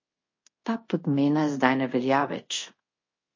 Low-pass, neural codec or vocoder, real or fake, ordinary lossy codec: 7.2 kHz; codec, 24 kHz, 0.5 kbps, DualCodec; fake; MP3, 32 kbps